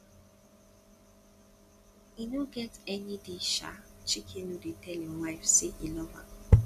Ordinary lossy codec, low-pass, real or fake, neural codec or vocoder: none; 14.4 kHz; real; none